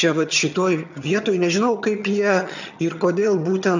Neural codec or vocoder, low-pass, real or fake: vocoder, 22.05 kHz, 80 mel bands, HiFi-GAN; 7.2 kHz; fake